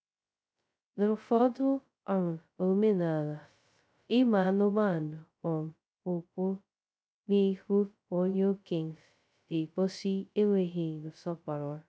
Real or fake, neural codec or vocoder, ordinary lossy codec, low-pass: fake; codec, 16 kHz, 0.2 kbps, FocalCodec; none; none